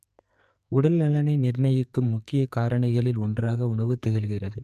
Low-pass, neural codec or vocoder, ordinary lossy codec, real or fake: 14.4 kHz; codec, 44.1 kHz, 2.6 kbps, SNAC; none; fake